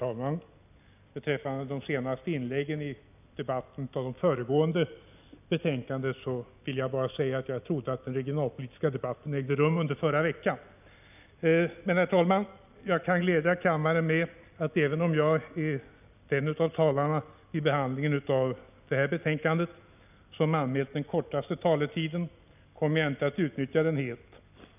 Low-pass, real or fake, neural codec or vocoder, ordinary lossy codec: 3.6 kHz; real; none; none